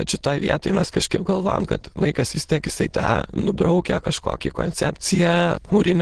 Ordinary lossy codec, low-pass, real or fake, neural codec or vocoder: Opus, 16 kbps; 9.9 kHz; fake; autoencoder, 22.05 kHz, a latent of 192 numbers a frame, VITS, trained on many speakers